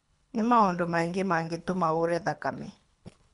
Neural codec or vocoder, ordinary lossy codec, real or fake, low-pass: codec, 24 kHz, 3 kbps, HILCodec; MP3, 96 kbps; fake; 10.8 kHz